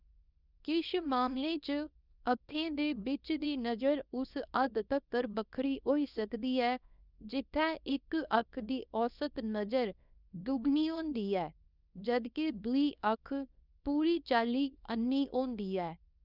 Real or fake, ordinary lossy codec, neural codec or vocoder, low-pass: fake; none; codec, 24 kHz, 0.9 kbps, WavTokenizer, small release; 5.4 kHz